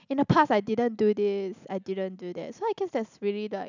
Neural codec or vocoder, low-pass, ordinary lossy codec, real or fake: none; 7.2 kHz; none; real